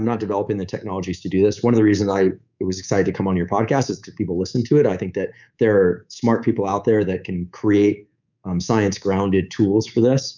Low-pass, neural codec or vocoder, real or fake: 7.2 kHz; codec, 24 kHz, 3.1 kbps, DualCodec; fake